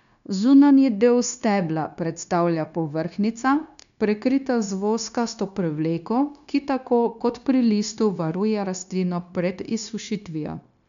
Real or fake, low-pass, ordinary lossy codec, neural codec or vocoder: fake; 7.2 kHz; none; codec, 16 kHz, 0.9 kbps, LongCat-Audio-Codec